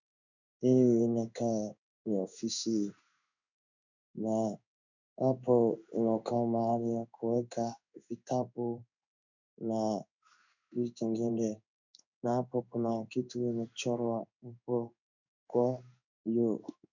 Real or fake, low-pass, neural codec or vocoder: fake; 7.2 kHz; codec, 16 kHz in and 24 kHz out, 1 kbps, XY-Tokenizer